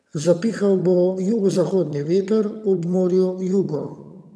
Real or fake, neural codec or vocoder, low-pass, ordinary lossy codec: fake; vocoder, 22.05 kHz, 80 mel bands, HiFi-GAN; none; none